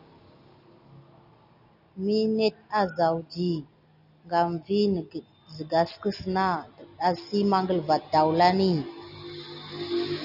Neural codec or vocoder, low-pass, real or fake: none; 5.4 kHz; real